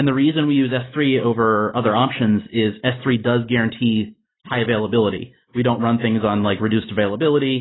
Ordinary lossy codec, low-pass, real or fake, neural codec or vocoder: AAC, 16 kbps; 7.2 kHz; real; none